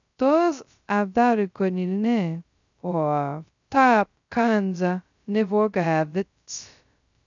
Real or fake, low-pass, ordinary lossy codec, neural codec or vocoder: fake; 7.2 kHz; none; codec, 16 kHz, 0.2 kbps, FocalCodec